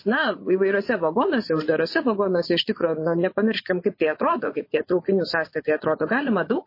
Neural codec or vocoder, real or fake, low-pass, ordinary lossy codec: vocoder, 24 kHz, 100 mel bands, Vocos; fake; 5.4 kHz; MP3, 24 kbps